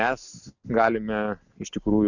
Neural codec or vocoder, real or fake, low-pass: none; real; 7.2 kHz